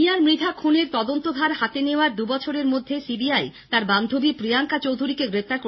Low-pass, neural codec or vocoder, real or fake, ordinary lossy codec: 7.2 kHz; none; real; MP3, 24 kbps